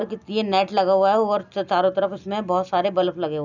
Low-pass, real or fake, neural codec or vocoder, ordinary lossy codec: 7.2 kHz; real; none; none